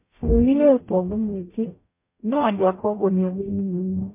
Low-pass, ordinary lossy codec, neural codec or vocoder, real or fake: 3.6 kHz; MP3, 24 kbps; codec, 44.1 kHz, 0.9 kbps, DAC; fake